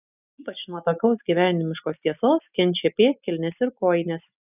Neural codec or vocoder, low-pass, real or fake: none; 3.6 kHz; real